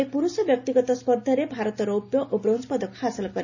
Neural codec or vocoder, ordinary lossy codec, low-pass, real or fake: none; none; 7.2 kHz; real